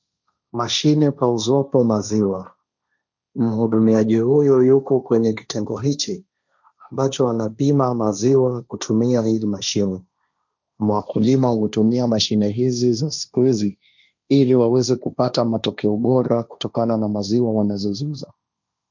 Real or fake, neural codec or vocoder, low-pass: fake; codec, 16 kHz, 1.1 kbps, Voila-Tokenizer; 7.2 kHz